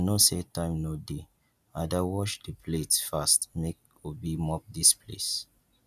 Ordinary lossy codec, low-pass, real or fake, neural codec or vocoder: none; 14.4 kHz; real; none